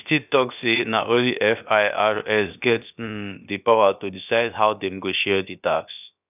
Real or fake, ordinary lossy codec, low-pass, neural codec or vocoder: fake; none; 3.6 kHz; codec, 16 kHz, about 1 kbps, DyCAST, with the encoder's durations